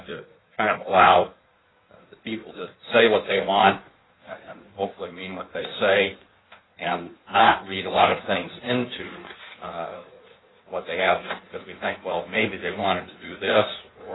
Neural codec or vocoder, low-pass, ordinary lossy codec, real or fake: codec, 16 kHz in and 24 kHz out, 1.1 kbps, FireRedTTS-2 codec; 7.2 kHz; AAC, 16 kbps; fake